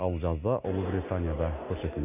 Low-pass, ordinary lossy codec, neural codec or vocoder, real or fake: 3.6 kHz; none; none; real